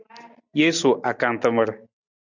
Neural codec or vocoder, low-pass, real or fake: none; 7.2 kHz; real